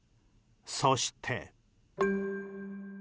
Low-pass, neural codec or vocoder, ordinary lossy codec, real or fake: none; none; none; real